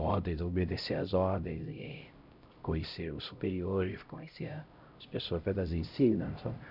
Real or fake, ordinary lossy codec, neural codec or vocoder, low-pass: fake; Opus, 64 kbps; codec, 16 kHz, 0.5 kbps, X-Codec, HuBERT features, trained on LibriSpeech; 5.4 kHz